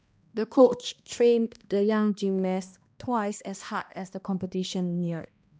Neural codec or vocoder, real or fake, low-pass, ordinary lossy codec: codec, 16 kHz, 1 kbps, X-Codec, HuBERT features, trained on balanced general audio; fake; none; none